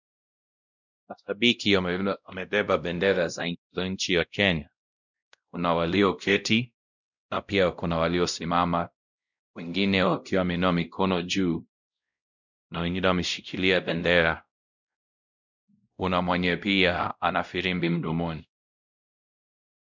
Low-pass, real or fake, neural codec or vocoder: 7.2 kHz; fake; codec, 16 kHz, 0.5 kbps, X-Codec, WavLM features, trained on Multilingual LibriSpeech